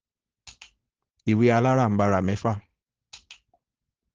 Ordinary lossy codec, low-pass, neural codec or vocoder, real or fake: Opus, 16 kbps; 7.2 kHz; codec, 16 kHz, 4.8 kbps, FACodec; fake